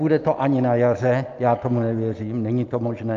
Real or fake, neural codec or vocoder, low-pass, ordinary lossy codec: real; none; 7.2 kHz; Opus, 24 kbps